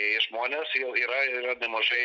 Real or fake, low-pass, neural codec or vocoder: real; 7.2 kHz; none